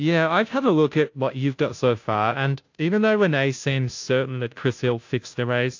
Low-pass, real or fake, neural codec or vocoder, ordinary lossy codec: 7.2 kHz; fake; codec, 16 kHz, 0.5 kbps, FunCodec, trained on Chinese and English, 25 frames a second; AAC, 48 kbps